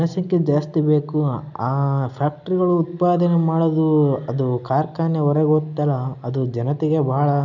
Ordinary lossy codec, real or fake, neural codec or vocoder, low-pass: none; real; none; 7.2 kHz